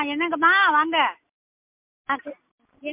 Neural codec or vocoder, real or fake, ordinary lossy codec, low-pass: none; real; MP3, 32 kbps; 3.6 kHz